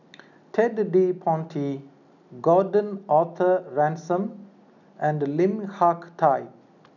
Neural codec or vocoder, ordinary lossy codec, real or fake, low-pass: none; none; real; 7.2 kHz